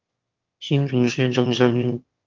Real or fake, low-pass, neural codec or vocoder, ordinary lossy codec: fake; 7.2 kHz; autoencoder, 22.05 kHz, a latent of 192 numbers a frame, VITS, trained on one speaker; Opus, 24 kbps